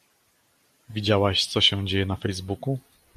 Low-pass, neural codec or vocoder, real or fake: 14.4 kHz; none; real